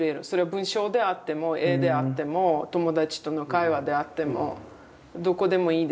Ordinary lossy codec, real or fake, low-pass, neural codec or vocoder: none; real; none; none